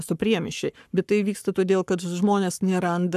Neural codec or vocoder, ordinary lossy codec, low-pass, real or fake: codec, 44.1 kHz, 7.8 kbps, Pupu-Codec; AAC, 96 kbps; 14.4 kHz; fake